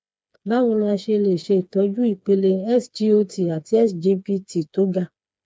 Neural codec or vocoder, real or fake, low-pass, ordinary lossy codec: codec, 16 kHz, 4 kbps, FreqCodec, smaller model; fake; none; none